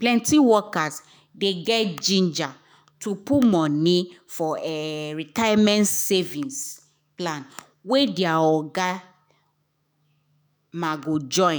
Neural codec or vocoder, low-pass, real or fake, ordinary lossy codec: autoencoder, 48 kHz, 128 numbers a frame, DAC-VAE, trained on Japanese speech; none; fake; none